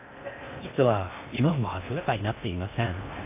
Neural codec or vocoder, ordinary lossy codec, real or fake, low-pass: codec, 16 kHz in and 24 kHz out, 0.6 kbps, FocalCodec, streaming, 2048 codes; AAC, 32 kbps; fake; 3.6 kHz